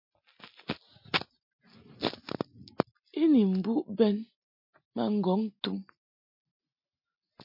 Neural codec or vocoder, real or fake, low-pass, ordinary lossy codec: none; real; 5.4 kHz; MP3, 32 kbps